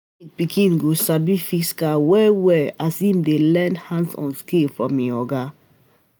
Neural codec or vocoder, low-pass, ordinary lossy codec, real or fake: none; none; none; real